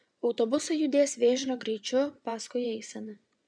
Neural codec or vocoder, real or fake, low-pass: vocoder, 24 kHz, 100 mel bands, Vocos; fake; 9.9 kHz